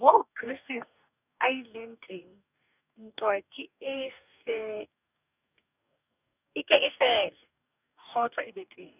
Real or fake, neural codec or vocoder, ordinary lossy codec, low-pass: fake; codec, 44.1 kHz, 2.6 kbps, DAC; none; 3.6 kHz